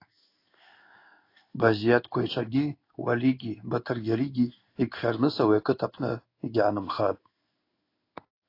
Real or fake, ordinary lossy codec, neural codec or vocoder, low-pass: fake; AAC, 32 kbps; codec, 16 kHz in and 24 kHz out, 1 kbps, XY-Tokenizer; 5.4 kHz